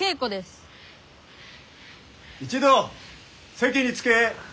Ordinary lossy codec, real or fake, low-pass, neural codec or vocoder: none; real; none; none